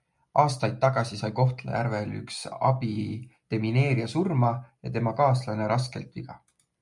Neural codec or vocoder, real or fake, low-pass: none; real; 10.8 kHz